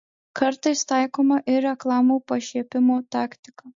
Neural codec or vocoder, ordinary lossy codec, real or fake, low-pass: none; MP3, 48 kbps; real; 7.2 kHz